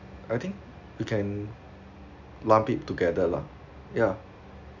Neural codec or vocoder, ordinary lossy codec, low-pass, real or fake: none; none; 7.2 kHz; real